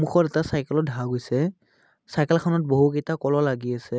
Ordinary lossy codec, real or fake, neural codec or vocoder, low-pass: none; real; none; none